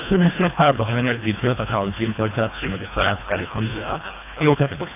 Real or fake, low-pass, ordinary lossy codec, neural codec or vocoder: fake; 3.6 kHz; AAC, 32 kbps; codec, 24 kHz, 1.5 kbps, HILCodec